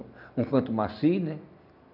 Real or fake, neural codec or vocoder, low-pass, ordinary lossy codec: real; none; 5.4 kHz; none